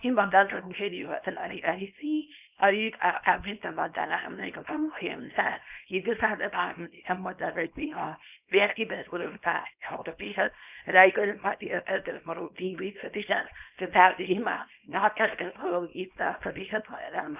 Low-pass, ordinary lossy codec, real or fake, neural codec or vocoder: 3.6 kHz; none; fake; codec, 24 kHz, 0.9 kbps, WavTokenizer, small release